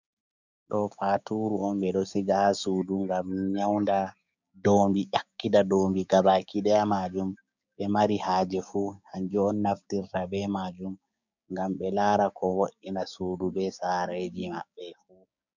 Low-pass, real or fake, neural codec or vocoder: 7.2 kHz; fake; codec, 16 kHz, 6 kbps, DAC